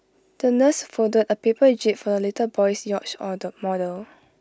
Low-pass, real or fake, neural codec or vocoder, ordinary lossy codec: none; real; none; none